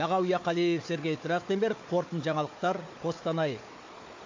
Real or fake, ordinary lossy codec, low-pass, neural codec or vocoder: fake; MP3, 48 kbps; 7.2 kHz; codec, 16 kHz, 4 kbps, FunCodec, trained on Chinese and English, 50 frames a second